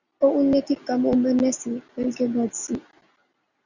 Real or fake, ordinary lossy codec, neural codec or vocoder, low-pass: real; Opus, 64 kbps; none; 7.2 kHz